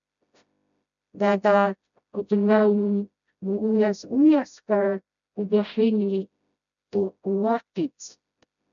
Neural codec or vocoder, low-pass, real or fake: codec, 16 kHz, 0.5 kbps, FreqCodec, smaller model; 7.2 kHz; fake